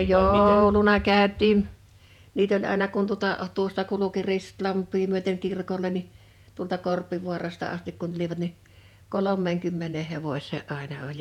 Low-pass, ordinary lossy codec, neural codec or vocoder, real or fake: 19.8 kHz; none; vocoder, 48 kHz, 128 mel bands, Vocos; fake